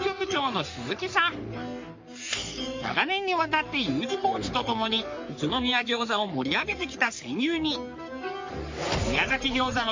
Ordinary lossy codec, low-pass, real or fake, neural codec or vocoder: MP3, 48 kbps; 7.2 kHz; fake; codec, 44.1 kHz, 3.4 kbps, Pupu-Codec